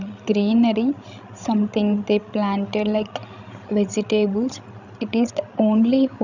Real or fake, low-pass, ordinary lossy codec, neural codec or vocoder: fake; 7.2 kHz; none; codec, 16 kHz, 16 kbps, FreqCodec, larger model